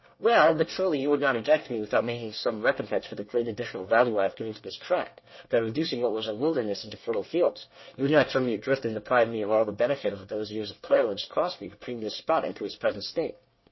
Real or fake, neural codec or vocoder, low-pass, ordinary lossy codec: fake; codec, 24 kHz, 1 kbps, SNAC; 7.2 kHz; MP3, 24 kbps